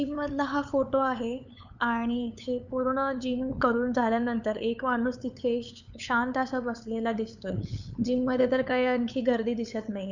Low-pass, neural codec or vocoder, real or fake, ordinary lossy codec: 7.2 kHz; codec, 16 kHz, 8 kbps, FunCodec, trained on LibriTTS, 25 frames a second; fake; none